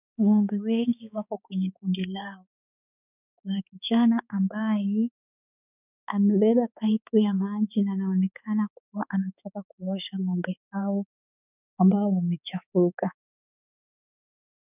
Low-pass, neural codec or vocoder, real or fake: 3.6 kHz; codec, 16 kHz, 4 kbps, X-Codec, HuBERT features, trained on balanced general audio; fake